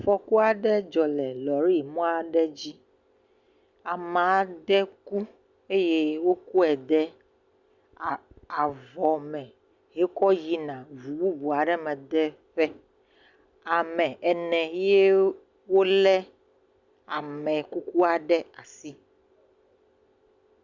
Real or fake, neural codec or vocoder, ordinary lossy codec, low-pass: real; none; Opus, 64 kbps; 7.2 kHz